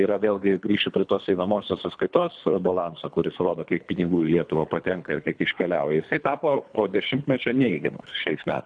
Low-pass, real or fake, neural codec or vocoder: 9.9 kHz; fake; codec, 24 kHz, 3 kbps, HILCodec